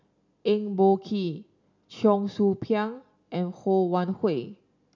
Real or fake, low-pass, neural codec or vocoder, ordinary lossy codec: real; 7.2 kHz; none; none